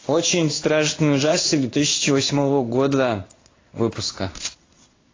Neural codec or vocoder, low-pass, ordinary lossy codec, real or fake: codec, 16 kHz in and 24 kHz out, 1 kbps, XY-Tokenizer; 7.2 kHz; AAC, 32 kbps; fake